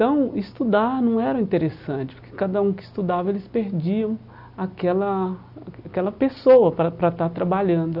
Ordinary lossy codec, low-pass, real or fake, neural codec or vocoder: none; 5.4 kHz; real; none